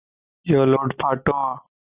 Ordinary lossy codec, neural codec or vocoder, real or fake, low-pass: Opus, 64 kbps; none; real; 3.6 kHz